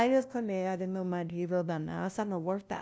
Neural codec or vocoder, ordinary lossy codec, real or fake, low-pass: codec, 16 kHz, 0.5 kbps, FunCodec, trained on LibriTTS, 25 frames a second; none; fake; none